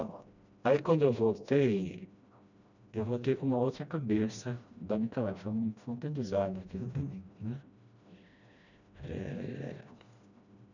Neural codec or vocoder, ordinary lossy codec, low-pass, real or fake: codec, 16 kHz, 1 kbps, FreqCodec, smaller model; none; 7.2 kHz; fake